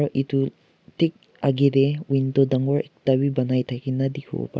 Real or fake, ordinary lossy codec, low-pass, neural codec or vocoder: real; none; none; none